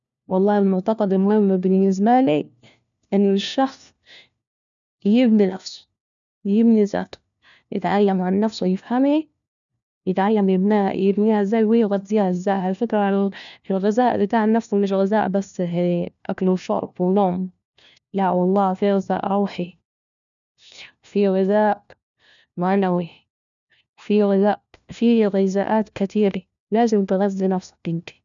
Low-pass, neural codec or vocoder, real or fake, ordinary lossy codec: 7.2 kHz; codec, 16 kHz, 1 kbps, FunCodec, trained on LibriTTS, 50 frames a second; fake; none